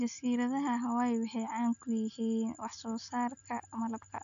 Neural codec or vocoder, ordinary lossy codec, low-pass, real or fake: none; none; 7.2 kHz; real